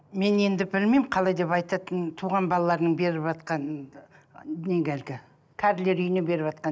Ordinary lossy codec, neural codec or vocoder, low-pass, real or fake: none; none; none; real